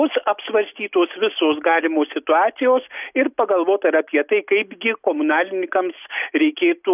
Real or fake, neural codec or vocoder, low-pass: real; none; 3.6 kHz